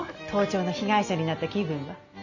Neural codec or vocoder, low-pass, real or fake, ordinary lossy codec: none; 7.2 kHz; real; none